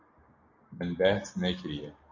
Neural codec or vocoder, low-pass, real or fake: none; 7.2 kHz; real